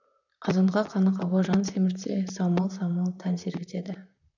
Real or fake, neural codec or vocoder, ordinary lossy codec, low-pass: fake; vocoder, 44.1 kHz, 128 mel bands, Pupu-Vocoder; none; 7.2 kHz